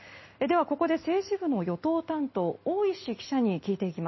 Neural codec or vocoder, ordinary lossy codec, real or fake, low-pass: none; MP3, 24 kbps; real; 7.2 kHz